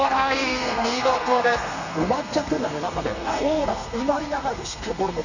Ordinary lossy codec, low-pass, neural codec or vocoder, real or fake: none; 7.2 kHz; codec, 32 kHz, 1.9 kbps, SNAC; fake